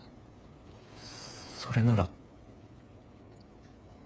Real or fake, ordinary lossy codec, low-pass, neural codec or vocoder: fake; none; none; codec, 16 kHz, 8 kbps, FreqCodec, smaller model